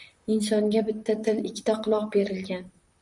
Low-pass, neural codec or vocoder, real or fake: 10.8 kHz; vocoder, 44.1 kHz, 128 mel bands, Pupu-Vocoder; fake